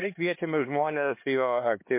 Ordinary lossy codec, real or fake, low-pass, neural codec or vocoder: MP3, 24 kbps; fake; 3.6 kHz; codec, 16 kHz, 2 kbps, X-Codec, HuBERT features, trained on LibriSpeech